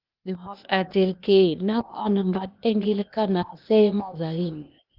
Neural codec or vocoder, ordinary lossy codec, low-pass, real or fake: codec, 16 kHz, 0.8 kbps, ZipCodec; Opus, 32 kbps; 5.4 kHz; fake